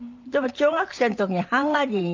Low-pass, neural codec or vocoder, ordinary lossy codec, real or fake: 7.2 kHz; vocoder, 44.1 kHz, 128 mel bands every 512 samples, BigVGAN v2; Opus, 24 kbps; fake